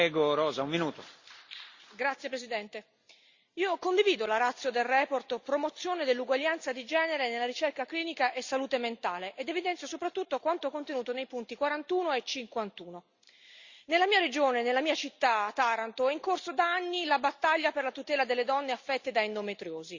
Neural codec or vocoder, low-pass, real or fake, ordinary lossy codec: none; 7.2 kHz; real; Opus, 64 kbps